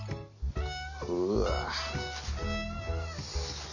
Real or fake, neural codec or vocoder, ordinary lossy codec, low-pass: real; none; none; 7.2 kHz